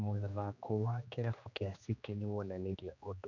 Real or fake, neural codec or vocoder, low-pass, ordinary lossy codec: fake; codec, 16 kHz, 2 kbps, X-Codec, HuBERT features, trained on general audio; 7.2 kHz; none